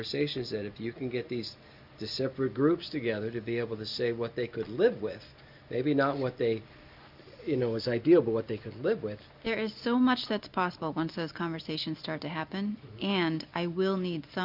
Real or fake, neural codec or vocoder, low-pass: real; none; 5.4 kHz